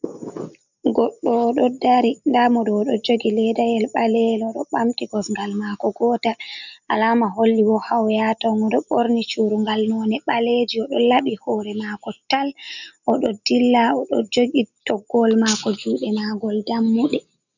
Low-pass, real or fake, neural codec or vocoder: 7.2 kHz; real; none